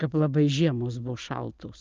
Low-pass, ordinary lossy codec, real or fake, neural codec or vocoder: 7.2 kHz; Opus, 32 kbps; real; none